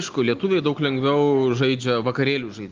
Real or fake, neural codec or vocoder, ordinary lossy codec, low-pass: real; none; Opus, 32 kbps; 7.2 kHz